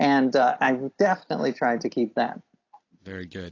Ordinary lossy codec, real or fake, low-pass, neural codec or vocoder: AAC, 32 kbps; real; 7.2 kHz; none